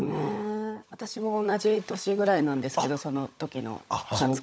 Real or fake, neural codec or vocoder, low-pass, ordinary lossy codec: fake; codec, 16 kHz, 16 kbps, FunCodec, trained on LibriTTS, 50 frames a second; none; none